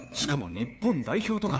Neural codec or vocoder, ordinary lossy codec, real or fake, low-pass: codec, 16 kHz, 2 kbps, FunCodec, trained on LibriTTS, 25 frames a second; none; fake; none